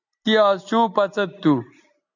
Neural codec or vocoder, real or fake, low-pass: none; real; 7.2 kHz